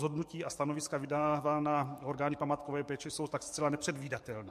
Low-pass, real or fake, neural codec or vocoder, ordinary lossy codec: 14.4 kHz; fake; codec, 44.1 kHz, 7.8 kbps, DAC; MP3, 64 kbps